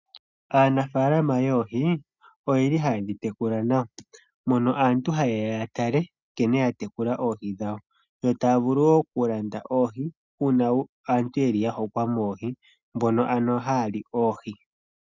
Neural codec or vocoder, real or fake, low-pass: none; real; 7.2 kHz